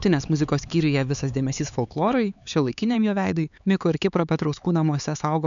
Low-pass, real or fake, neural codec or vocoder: 7.2 kHz; fake; codec, 16 kHz, 4 kbps, X-Codec, HuBERT features, trained on LibriSpeech